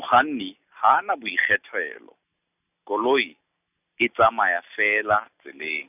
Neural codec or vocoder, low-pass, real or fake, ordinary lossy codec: none; 3.6 kHz; real; none